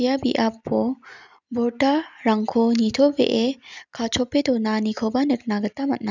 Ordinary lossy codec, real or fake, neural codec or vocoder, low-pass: none; real; none; 7.2 kHz